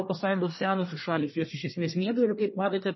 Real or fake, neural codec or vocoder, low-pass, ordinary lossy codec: fake; codec, 24 kHz, 1 kbps, SNAC; 7.2 kHz; MP3, 24 kbps